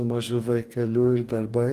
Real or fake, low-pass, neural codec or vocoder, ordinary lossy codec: fake; 14.4 kHz; codec, 44.1 kHz, 2.6 kbps, DAC; Opus, 24 kbps